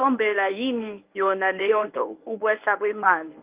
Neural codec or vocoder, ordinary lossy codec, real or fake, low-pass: codec, 24 kHz, 0.9 kbps, WavTokenizer, medium speech release version 1; Opus, 24 kbps; fake; 3.6 kHz